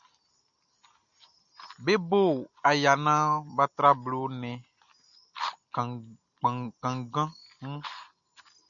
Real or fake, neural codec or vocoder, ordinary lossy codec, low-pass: real; none; MP3, 96 kbps; 7.2 kHz